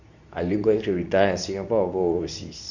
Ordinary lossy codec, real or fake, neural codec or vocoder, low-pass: none; fake; codec, 24 kHz, 0.9 kbps, WavTokenizer, medium speech release version 2; 7.2 kHz